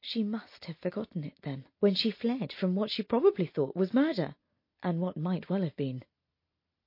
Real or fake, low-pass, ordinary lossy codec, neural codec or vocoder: real; 5.4 kHz; MP3, 32 kbps; none